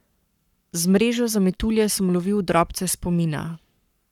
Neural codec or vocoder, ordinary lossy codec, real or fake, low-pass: codec, 44.1 kHz, 7.8 kbps, Pupu-Codec; none; fake; 19.8 kHz